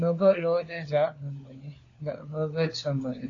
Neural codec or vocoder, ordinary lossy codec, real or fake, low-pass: codec, 16 kHz, 4 kbps, FunCodec, trained on LibriTTS, 50 frames a second; AAC, 32 kbps; fake; 7.2 kHz